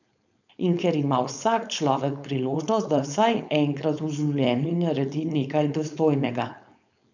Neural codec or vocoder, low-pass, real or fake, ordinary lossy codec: codec, 16 kHz, 4.8 kbps, FACodec; 7.2 kHz; fake; none